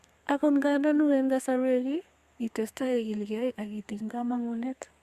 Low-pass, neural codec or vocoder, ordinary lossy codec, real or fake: 14.4 kHz; codec, 32 kHz, 1.9 kbps, SNAC; Opus, 64 kbps; fake